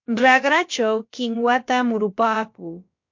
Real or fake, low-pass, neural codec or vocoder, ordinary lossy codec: fake; 7.2 kHz; codec, 16 kHz, about 1 kbps, DyCAST, with the encoder's durations; MP3, 48 kbps